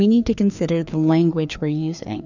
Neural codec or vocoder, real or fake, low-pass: codec, 16 kHz, 2 kbps, FreqCodec, larger model; fake; 7.2 kHz